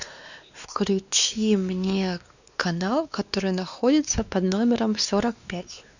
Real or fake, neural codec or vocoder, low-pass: fake; codec, 16 kHz, 2 kbps, X-Codec, WavLM features, trained on Multilingual LibriSpeech; 7.2 kHz